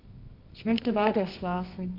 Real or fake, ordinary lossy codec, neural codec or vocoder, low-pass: fake; none; codec, 24 kHz, 0.9 kbps, WavTokenizer, medium music audio release; 5.4 kHz